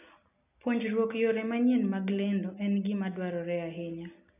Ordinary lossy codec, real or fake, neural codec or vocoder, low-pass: none; real; none; 3.6 kHz